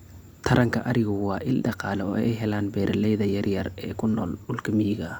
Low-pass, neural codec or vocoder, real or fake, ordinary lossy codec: 19.8 kHz; none; real; Opus, 64 kbps